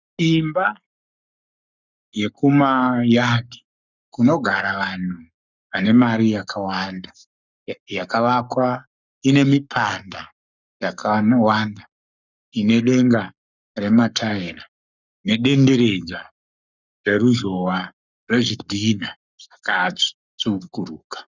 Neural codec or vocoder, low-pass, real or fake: codec, 44.1 kHz, 7.8 kbps, Pupu-Codec; 7.2 kHz; fake